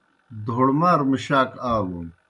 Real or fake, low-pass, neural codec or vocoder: real; 10.8 kHz; none